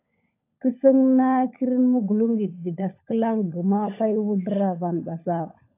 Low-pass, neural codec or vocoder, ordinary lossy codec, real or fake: 3.6 kHz; codec, 16 kHz, 16 kbps, FunCodec, trained on LibriTTS, 50 frames a second; AAC, 24 kbps; fake